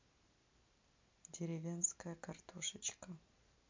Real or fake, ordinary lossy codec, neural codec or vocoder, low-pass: real; MP3, 48 kbps; none; 7.2 kHz